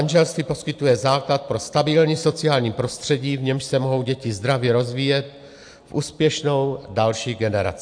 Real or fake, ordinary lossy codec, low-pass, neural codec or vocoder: real; AAC, 64 kbps; 9.9 kHz; none